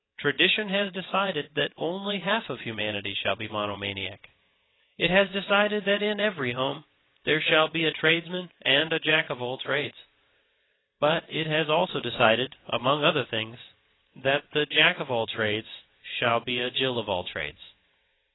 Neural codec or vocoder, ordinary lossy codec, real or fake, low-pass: vocoder, 22.05 kHz, 80 mel bands, WaveNeXt; AAC, 16 kbps; fake; 7.2 kHz